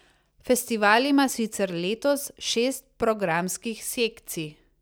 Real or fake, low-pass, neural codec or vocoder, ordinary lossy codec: real; none; none; none